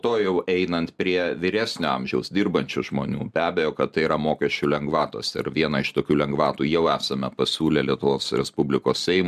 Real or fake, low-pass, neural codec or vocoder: real; 14.4 kHz; none